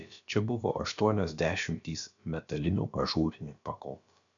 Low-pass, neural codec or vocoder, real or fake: 7.2 kHz; codec, 16 kHz, about 1 kbps, DyCAST, with the encoder's durations; fake